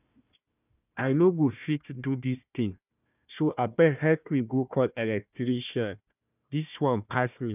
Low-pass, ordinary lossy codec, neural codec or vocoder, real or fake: 3.6 kHz; none; codec, 16 kHz, 1 kbps, FunCodec, trained on Chinese and English, 50 frames a second; fake